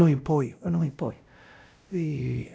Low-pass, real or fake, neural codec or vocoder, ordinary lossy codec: none; fake; codec, 16 kHz, 0.5 kbps, X-Codec, WavLM features, trained on Multilingual LibriSpeech; none